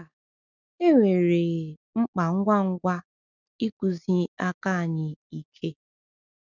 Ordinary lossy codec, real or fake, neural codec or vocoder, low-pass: none; real; none; 7.2 kHz